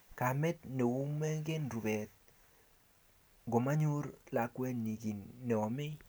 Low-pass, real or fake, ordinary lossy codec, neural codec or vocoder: none; real; none; none